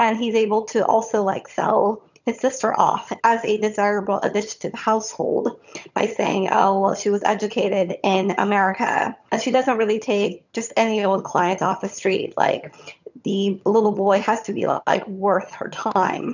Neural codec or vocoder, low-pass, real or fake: vocoder, 22.05 kHz, 80 mel bands, HiFi-GAN; 7.2 kHz; fake